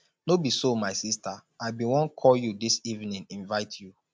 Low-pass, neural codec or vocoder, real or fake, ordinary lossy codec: none; none; real; none